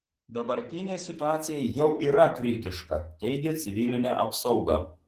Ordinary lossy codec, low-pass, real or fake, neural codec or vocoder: Opus, 16 kbps; 14.4 kHz; fake; codec, 44.1 kHz, 2.6 kbps, SNAC